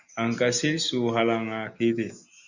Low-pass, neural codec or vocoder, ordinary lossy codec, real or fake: 7.2 kHz; none; Opus, 64 kbps; real